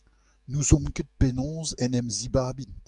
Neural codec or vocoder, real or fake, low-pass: codec, 44.1 kHz, 7.8 kbps, DAC; fake; 10.8 kHz